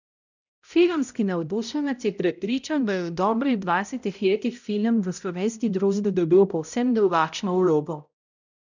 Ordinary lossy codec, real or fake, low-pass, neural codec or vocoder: none; fake; 7.2 kHz; codec, 16 kHz, 0.5 kbps, X-Codec, HuBERT features, trained on balanced general audio